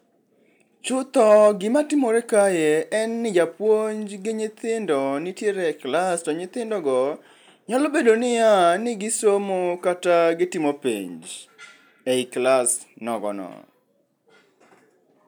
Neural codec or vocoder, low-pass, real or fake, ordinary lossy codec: none; none; real; none